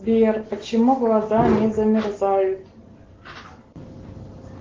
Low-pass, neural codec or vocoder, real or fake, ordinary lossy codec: 7.2 kHz; none; real; Opus, 16 kbps